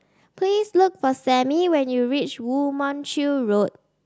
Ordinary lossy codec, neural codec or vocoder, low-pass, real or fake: none; none; none; real